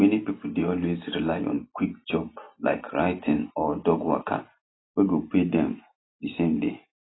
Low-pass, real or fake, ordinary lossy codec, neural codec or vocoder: 7.2 kHz; real; AAC, 16 kbps; none